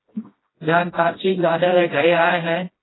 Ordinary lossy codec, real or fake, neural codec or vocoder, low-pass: AAC, 16 kbps; fake; codec, 16 kHz, 1 kbps, FreqCodec, smaller model; 7.2 kHz